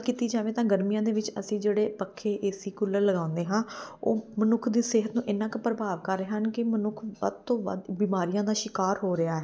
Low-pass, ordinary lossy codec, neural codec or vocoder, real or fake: none; none; none; real